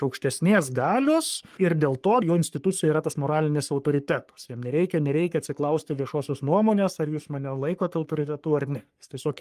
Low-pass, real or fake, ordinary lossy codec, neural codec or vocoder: 14.4 kHz; fake; Opus, 32 kbps; codec, 44.1 kHz, 3.4 kbps, Pupu-Codec